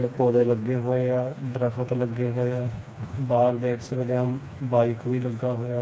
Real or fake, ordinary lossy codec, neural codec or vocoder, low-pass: fake; none; codec, 16 kHz, 2 kbps, FreqCodec, smaller model; none